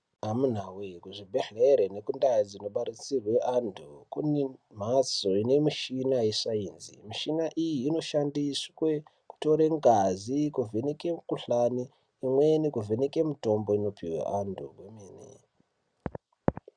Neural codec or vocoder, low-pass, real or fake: none; 9.9 kHz; real